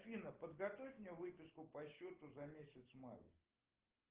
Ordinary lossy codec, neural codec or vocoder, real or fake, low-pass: Opus, 16 kbps; none; real; 3.6 kHz